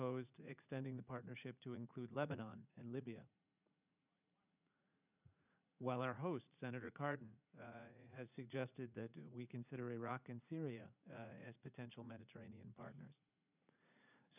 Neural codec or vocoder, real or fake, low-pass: vocoder, 44.1 kHz, 80 mel bands, Vocos; fake; 3.6 kHz